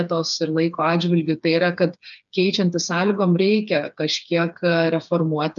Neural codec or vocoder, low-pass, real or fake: codec, 16 kHz, 4 kbps, FreqCodec, smaller model; 7.2 kHz; fake